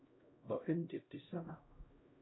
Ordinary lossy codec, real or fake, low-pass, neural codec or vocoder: AAC, 16 kbps; fake; 7.2 kHz; codec, 16 kHz, 0.5 kbps, X-Codec, HuBERT features, trained on LibriSpeech